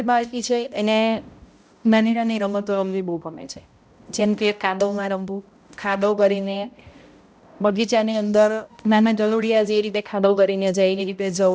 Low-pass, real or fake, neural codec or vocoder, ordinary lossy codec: none; fake; codec, 16 kHz, 0.5 kbps, X-Codec, HuBERT features, trained on balanced general audio; none